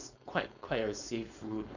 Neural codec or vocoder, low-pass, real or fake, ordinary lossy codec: codec, 16 kHz, 4.8 kbps, FACodec; 7.2 kHz; fake; none